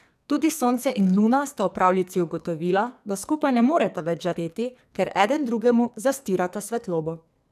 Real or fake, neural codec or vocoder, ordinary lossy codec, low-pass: fake; codec, 44.1 kHz, 2.6 kbps, SNAC; none; 14.4 kHz